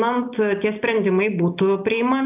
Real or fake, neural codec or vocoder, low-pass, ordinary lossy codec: real; none; 3.6 kHz; AAC, 32 kbps